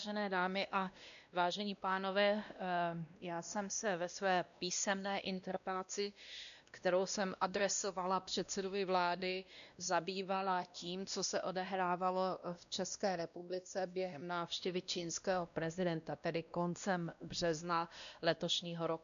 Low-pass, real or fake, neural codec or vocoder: 7.2 kHz; fake; codec, 16 kHz, 1 kbps, X-Codec, WavLM features, trained on Multilingual LibriSpeech